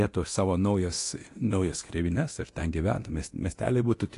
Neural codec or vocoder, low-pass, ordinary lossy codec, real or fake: codec, 24 kHz, 0.9 kbps, DualCodec; 10.8 kHz; AAC, 48 kbps; fake